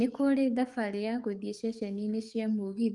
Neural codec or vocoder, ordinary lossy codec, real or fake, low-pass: codec, 44.1 kHz, 7.8 kbps, DAC; Opus, 32 kbps; fake; 10.8 kHz